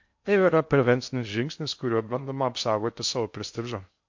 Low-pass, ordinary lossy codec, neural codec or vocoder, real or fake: 7.2 kHz; MP3, 48 kbps; codec, 16 kHz in and 24 kHz out, 0.6 kbps, FocalCodec, streaming, 2048 codes; fake